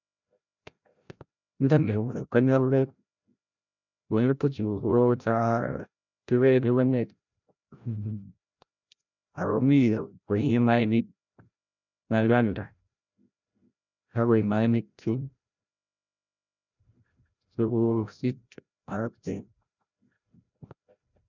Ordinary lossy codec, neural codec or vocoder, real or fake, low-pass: none; codec, 16 kHz, 0.5 kbps, FreqCodec, larger model; fake; 7.2 kHz